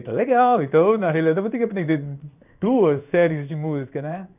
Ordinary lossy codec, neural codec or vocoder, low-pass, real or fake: none; codec, 16 kHz in and 24 kHz out, 1 kbps, XY-Tokenizer; 3.6 kHz; fake